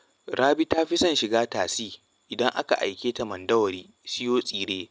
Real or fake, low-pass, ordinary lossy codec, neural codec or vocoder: real; none; none; none